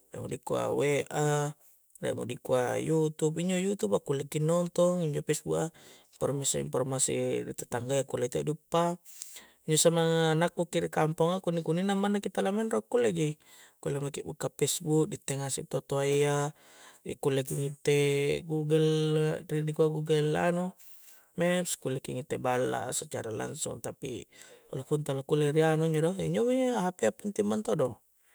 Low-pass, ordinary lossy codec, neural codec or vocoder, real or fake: none; none; autoencoder, 48 kHz, 128 numbers a frame, DAC-VAE, trained on Japanese speech; fake